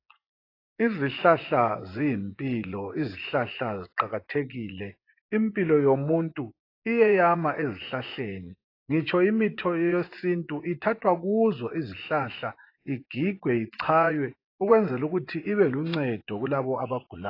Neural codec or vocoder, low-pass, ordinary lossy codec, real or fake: vocoder, 24 kHz, 100 mel bands, Vocos; 5.4 kHz; AAC, 32 kbps; fake